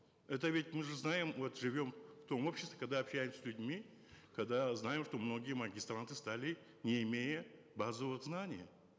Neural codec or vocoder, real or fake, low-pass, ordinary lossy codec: none; real; none; none